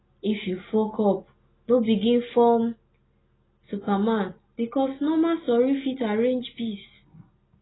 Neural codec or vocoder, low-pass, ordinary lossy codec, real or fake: none; 7.2 kHz; AAC, 16 kbps; real